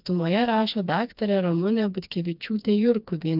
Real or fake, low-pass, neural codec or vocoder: fake; 5.4 kHz; codec, 16 kHz, 2 kbps, FreqCodec, smaller model